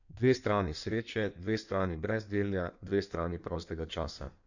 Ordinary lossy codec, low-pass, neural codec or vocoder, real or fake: none; 7.2 kHz; codec, 16 kHz in and 24 kHz out, 1.1 kbps, FireRedTTS-2 codec; fake